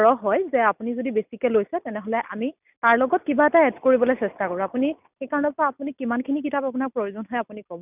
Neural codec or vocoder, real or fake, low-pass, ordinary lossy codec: none; real; 3.6 kHz; none